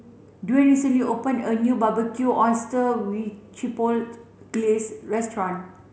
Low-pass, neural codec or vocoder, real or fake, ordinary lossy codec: none; none; real; none